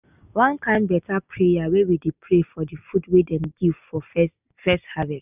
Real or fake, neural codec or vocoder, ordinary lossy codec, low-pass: real; none; none; 3.6 kHz